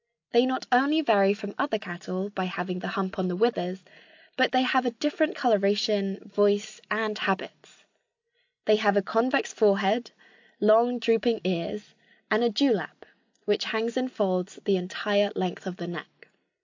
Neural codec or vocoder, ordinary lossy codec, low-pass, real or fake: none; AAC, 48 kbps; 7.2 kHz; real